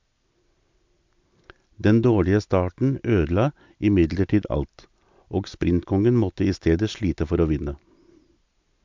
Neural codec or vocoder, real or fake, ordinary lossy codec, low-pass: none; real; MP3, 64 kbps; 7.2 kHz